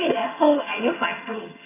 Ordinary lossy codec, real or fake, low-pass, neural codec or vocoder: AAC, 16 kbps; fake; 3.6 kHz; vocoder, 22.05 kHz, 80 mel bands, HiFi-GAN